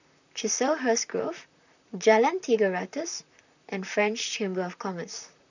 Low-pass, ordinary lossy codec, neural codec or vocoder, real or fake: 7.2 kHz; none; vocoder, 44.1 kHz, 128 mel bands, Pupu-Vocoder; fake